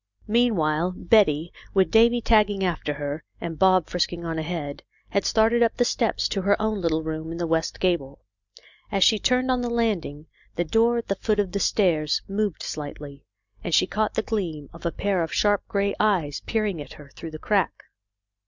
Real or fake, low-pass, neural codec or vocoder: real; 7.2 kHz; none